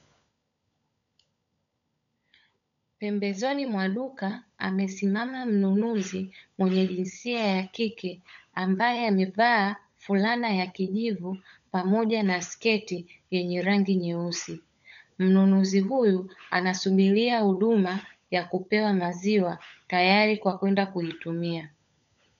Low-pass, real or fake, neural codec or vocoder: 7.2 kHz; fake; codec, 16 kHz, 16 kbps, FunCodec, trained on LibriTTS, 50 frames a second